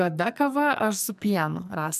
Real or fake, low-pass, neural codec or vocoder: fake; 14.4 kHz; codec, 44.1 kHz, 2.6 kbps, SNAC